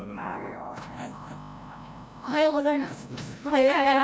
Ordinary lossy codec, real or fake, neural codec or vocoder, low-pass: none; fake; codec, 16 kHz, 0.5 kbps, FreqCodec, larger model; none